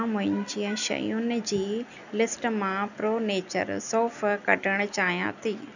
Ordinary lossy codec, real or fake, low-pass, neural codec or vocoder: none; real; 7.2 kHz; none